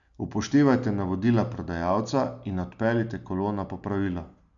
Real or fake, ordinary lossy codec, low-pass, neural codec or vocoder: real; none; 7.2 kHz; none